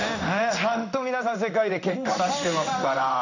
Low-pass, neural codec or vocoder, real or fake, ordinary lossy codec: 7.2 kHz; codec, 16 kHz in and 24 kHz out, 1 kbps, XY-Tokenizer; fake; none